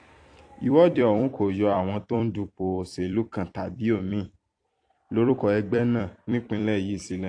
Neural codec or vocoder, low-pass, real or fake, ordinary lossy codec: vocoder, 44.1 kHz, 128 mel bands every 256 samples, BigVGAN v2; 9.9 kHz; fake; AAC, 48 kbps